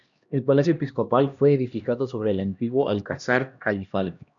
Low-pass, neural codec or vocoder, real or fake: 7.2 kHz; codec, 16 kHz, 1 kbps, X-Codec, HuBERT features, trained on LibriSpeech; fake